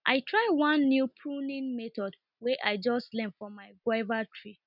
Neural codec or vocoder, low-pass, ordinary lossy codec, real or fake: none; 5.4 kHz; none; real